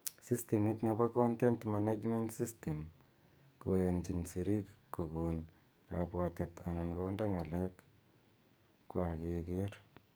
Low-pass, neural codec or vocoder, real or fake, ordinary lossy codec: none; codec, 44.1 kHz, 2.6 kbps, SNAC; fake; none